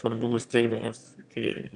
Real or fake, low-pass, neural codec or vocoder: fake; 9.9 kHz; autoencoder, 22.05 kHz, a latent of 192 numbers a frame, VITS, trained on one speaker